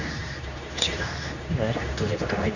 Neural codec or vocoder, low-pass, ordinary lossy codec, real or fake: codec, 24 kHz, 0.9 kbps, WavTokenizer, medium speech release version 2; 7.2 kHz; none; fake